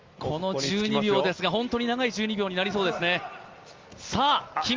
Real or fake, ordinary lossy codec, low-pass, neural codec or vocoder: real; Opus, 32 kbps; 7.2 kHz; none